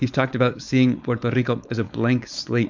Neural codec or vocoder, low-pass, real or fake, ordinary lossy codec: codec, 16 kHz, 4.8 kbps, FACodec; 7.2 kHz; fake; MP3, 64 kbps